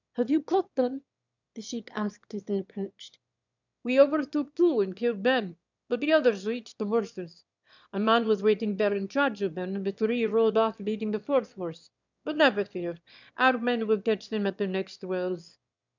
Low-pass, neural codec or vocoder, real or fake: 7.2 kHz; autoencoder, 22.05 kHz, a latent of 192 numbers a frame, VITS, trained on one speaker; fake